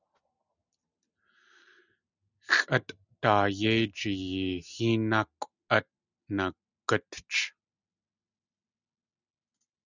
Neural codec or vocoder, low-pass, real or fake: none; 7.2 kHz; real